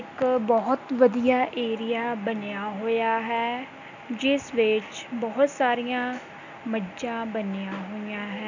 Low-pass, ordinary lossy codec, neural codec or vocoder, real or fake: 7.2 kHz; none; none; real